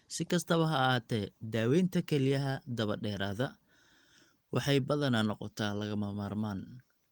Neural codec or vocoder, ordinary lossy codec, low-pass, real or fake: none; Opus, 24 kbps; 19.8 kHz; real